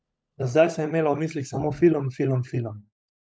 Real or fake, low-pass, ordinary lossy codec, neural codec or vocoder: fake; none; none; codec, 16 kHz, 16 kbps, FunCodec, trained on LibriTTS, 50 frames a second